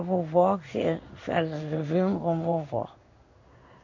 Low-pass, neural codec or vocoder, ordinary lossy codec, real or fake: 7.2 kHz; codec, 16 kHz in and 24 kHz out, 1 kbps, XY-Tokenizer; MP3, 64 kbps; fake